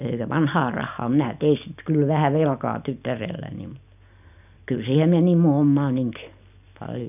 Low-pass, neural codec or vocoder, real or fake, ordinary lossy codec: 3.6 kHz; none; real; none